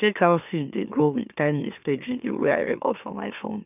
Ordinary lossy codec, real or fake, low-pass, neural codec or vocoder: none; fake; 3.6 kHz; autoencoder, 44.1 kHz, a latent of 192 numbers a frame, MeloTTS